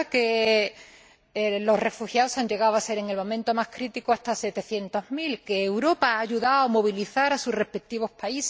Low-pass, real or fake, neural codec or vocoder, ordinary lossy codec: none; real; none; none